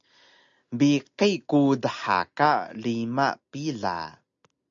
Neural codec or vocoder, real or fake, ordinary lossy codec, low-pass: none; real; AAC, 64 kbps; 7.2 kHz